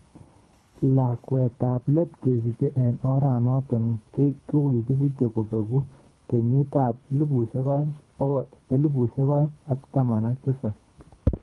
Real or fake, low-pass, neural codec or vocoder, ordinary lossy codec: fake; 10.8 kHz; codec, 24 kHz, 3 kbps, HILCodec; Opus, 32 kbps